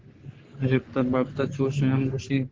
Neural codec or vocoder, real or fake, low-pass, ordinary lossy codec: codec, 44.1 kHz, 3.4 kbps, Pupu-Codec; fake; 7.2 kHz; Opus, 16 kbps